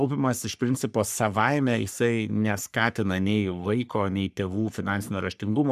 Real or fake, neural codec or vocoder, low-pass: fake; codec, 44.1 kHz, 3.4 kbps, Pupu-Codec; 14.4 kHz